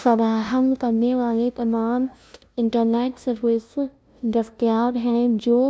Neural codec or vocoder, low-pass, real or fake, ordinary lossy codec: codec, 16 kHz, 0.5 kbps, FunCodec, trained on LibriTTS, 25 frames a second; none; fake; none